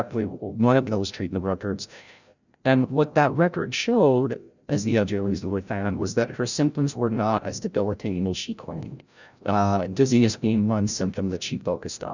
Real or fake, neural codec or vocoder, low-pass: fake; codec, 16 kHz, 0.5 kbps, FreqCodec, larger model; 7.2 kHz